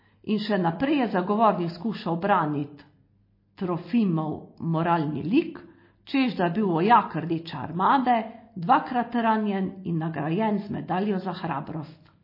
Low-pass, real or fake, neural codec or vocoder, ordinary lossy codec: 5.4 kHz; real; none; MP3, 24 kbps